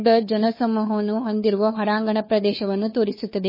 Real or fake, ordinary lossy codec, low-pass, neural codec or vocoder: fake; MP3, 24 kbps; 5.4 kHz; codec, 16 kHz, 2 kbps, FunCodec, trained on Chinese and English, 25 frames a second